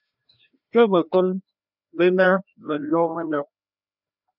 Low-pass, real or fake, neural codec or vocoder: 5.4 kHz; fake; codec, 16 kHz, 1 kbps, FreqCodec, larger model